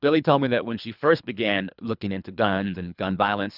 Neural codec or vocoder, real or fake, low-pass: codec, 24 kHz, 3 kbps, HILCodec; fake; 5.4 kHz